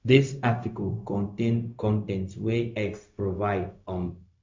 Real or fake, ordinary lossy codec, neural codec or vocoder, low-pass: fake; MP3, 64 kbps; codec, 16 kHz, 0.4 kbps, LongCat-Audio-Codec; 7.2 kHz